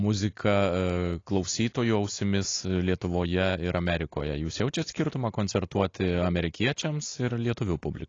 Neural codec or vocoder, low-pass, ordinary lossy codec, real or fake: none; 7.2 kHz; AAC, 32 kbps; real